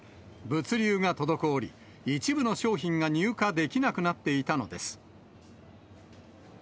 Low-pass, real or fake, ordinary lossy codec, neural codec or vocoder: none; real; none; none